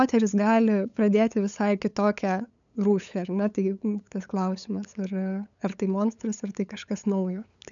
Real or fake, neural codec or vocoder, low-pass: fake; codec, 16 kHz, 8 kbps, FunCodec, trained on LibriTTS, 25 frames a second; 7.2 kHz